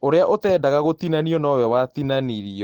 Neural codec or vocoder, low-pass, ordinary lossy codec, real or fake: none; 19.8 kHz; Opus, 16 kbps; real